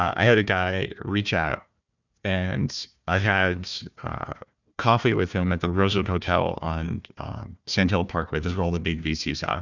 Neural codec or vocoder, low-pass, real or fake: codec, 16 kHz, 1 kbps, FunCodec, trained on Chinese and English, 50 frames a second; 7.2 kHz; fake